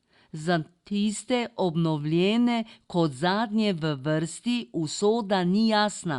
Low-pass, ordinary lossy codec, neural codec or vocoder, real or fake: 10.8 kHz; Opus, 64 kbps; none; real